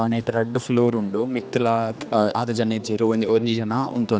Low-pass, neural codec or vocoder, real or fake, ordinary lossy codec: none; codec, 16 kHz, 2 kbps, X-Codec, HuBERT features, trained on general audio; fake; none